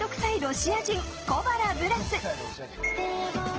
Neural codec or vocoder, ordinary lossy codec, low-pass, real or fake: none; Opus, 16 kbps; 7.2 kHz; real